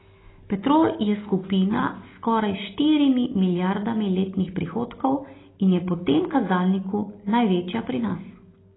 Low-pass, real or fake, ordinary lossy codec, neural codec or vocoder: 7.2 kHz; real; AAC, 16 kbps; none